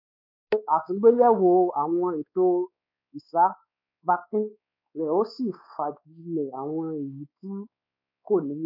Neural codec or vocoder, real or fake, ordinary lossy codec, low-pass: codec, 16 kHz, 4 kbps, X-Codec, WavLM features, trained on Multilingual LibriSpeech; fake; none; 5.4 kHz